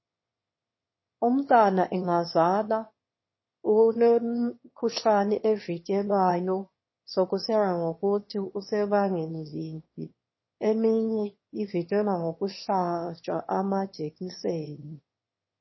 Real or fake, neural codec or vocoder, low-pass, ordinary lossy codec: fake; autoencoder, 22.05 kHz, a latent of 192 numbers a frame, VITS, trained on one speaker; 7.2 kHz; MP3, 24 kbps